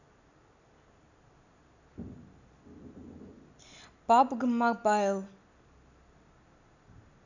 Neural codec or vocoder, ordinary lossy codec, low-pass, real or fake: none; none; 7.2 kHz; real